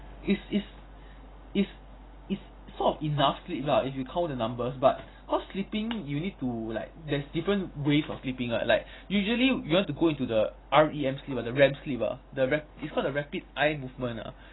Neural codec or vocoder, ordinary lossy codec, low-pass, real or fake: none; AAC, 16 kbps; 7.2 kHz; real